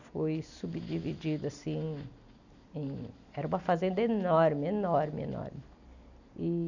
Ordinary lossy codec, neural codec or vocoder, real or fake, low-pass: none; none; real; 7.2 kHz